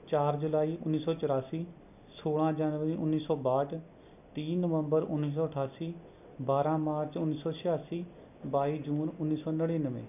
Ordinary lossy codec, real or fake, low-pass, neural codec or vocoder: none; real; 3.6 kHz; none